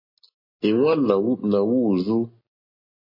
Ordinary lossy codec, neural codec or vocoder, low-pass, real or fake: MP3, 24 kbps; none; 5.4 kHz; real